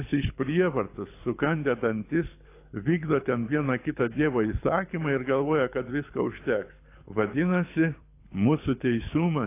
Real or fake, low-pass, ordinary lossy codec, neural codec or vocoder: fake; 3.6 kHz; AAC, 24 kbps; codec, 24 kHz, 6 kbps, HILCodec